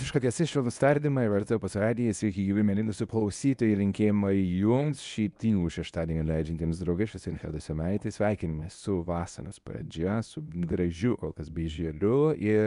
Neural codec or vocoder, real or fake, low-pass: codec, 24 kHz, 0.9 kbps, WavTokenizer, medium speech release version 1; fake; 10.8 kHz